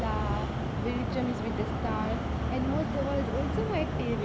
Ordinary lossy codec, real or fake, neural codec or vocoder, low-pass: none; real; none; none